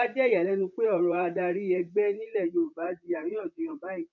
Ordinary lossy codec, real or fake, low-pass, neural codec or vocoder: none; fake; 7.2 kHz; vocoder, 44.1 kHz, 128 mel bands, Pupu-Vocoder